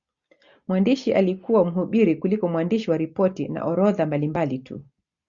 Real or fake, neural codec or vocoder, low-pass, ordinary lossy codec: real; none; 7.2 kHz; Opus, 64 kbps